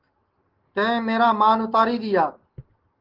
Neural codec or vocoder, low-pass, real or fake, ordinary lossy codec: none; 5.4 kHz; real; Opus, 16 kbps